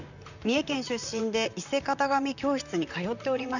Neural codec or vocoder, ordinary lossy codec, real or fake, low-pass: vocoder, 44.1 kHz, 128 mel bands, Pupu-Vocoder; none; fake; 7.2 kHz